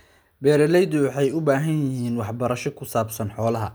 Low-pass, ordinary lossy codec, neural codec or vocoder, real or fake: none; none; none; real